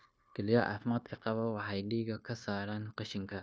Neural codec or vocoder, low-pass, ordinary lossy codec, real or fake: codec, 16 kHz, 0.9 kbps, LongCat-Audio-Codec; none; none; fake